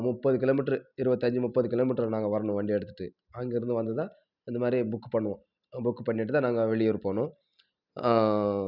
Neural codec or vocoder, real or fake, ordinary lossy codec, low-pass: none; real; none; 5.4 kHz